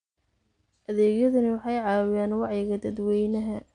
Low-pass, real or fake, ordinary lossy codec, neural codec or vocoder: 9.9 kHz; real; AAC, 64 kbps; none